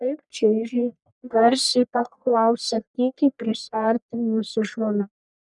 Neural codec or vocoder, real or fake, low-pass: codec, 44.1 kHz, 1.7 kbps, Pupu-Codec; fake; 10.8 kHz